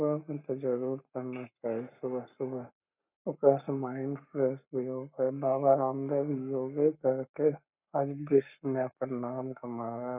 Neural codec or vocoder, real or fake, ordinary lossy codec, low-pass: codec, 44.1 kHz, 7.8 kbps, Pupu-Codec; fake; none; 3.6 kHz